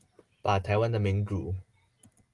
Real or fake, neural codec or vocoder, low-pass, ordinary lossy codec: real; none; 10.8 kHz; Opus, 24 kbps